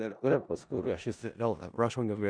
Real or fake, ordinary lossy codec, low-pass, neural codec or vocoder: fake; Opus, 64 kbps; 9.9 kHz; codec, 16 kHz in and 24 kHz out, 0.4 kbps, LongCat-Audio-Codec, four codebook decoder